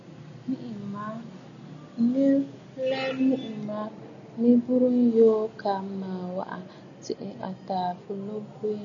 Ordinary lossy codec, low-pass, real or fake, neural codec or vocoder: MP3, 96 kbps; 7.2 kHz; real; none